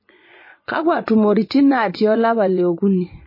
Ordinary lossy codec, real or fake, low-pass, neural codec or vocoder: MP3, 24 kbps; fake; 5.4 kHz; vocoder, 24 kHz, 100 mel bands, Vocos